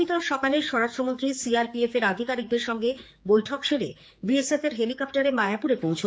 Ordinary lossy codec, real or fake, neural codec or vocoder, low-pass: none; fake; codec, 16 kHz, 4 kbps, X-Codec, HuBERT features, trained on general audio; none